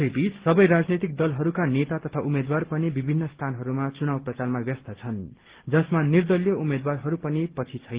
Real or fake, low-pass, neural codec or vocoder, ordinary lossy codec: real; 3.6 kHz; none; Opus, 16 kbps